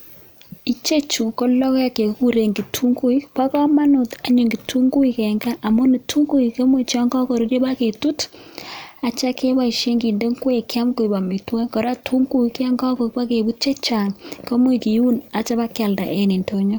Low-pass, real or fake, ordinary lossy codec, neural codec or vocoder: none; real; none; none